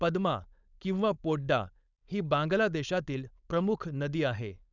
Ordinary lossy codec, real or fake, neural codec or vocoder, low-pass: none; fake; codec, 16 kHz, 4.8 kbps, FACodec; 7.2 kHz